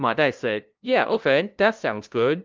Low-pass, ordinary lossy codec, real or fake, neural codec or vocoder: 7.2 kHz; Opus, 32 kbps; fake; codec, 16 kHz, 0.5 kbps, FunCodec, trained on LibriTTS, 25 frames a second